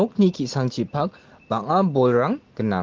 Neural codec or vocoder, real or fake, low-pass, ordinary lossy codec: none; real; 7.2 kHz; Opus, 16 kbps